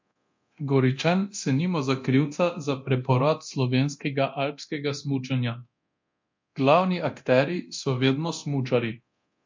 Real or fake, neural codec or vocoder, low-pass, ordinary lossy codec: fake; codec, 24 kHz, 0.9 kbps, DualCodec; 7.2 kHz; MP3, 48 kbps